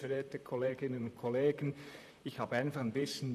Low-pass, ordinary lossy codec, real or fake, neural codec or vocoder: 14.4 kHz; none; fake; vocoder, 44.1 kHz, 128 mel bands, Pupu-Vocoder